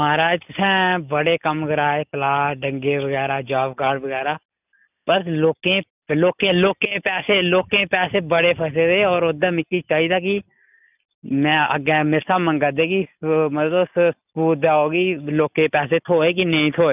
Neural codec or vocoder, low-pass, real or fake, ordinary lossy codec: none; 3.6 kHz; real; none